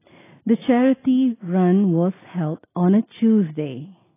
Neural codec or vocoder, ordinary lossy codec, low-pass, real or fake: none; AAC, 16 kbps; 3.6 kHz; real